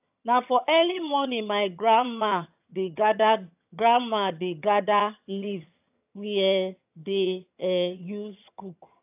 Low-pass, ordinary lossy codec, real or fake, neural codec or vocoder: 3.6 kHz; AAC, 32 kbps; fake; vocoder, 22.05 kHz, 80 mel bands, HiFi-GAN